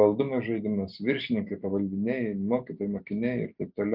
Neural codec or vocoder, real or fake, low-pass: none; real; 5.4 kHz